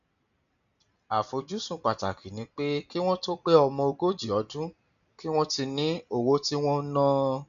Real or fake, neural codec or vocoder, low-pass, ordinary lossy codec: real; none; 7.2 kHz; none